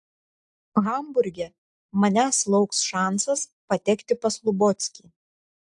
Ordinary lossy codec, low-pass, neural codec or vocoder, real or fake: AAC, 64 kbps; 10.8 kHz; none; real